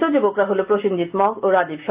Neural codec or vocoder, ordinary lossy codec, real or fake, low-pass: none; Opus, 24 kbps; real; 3.6 kHz